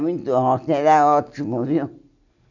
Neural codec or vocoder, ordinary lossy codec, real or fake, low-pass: none; none; real; 7.2 kHz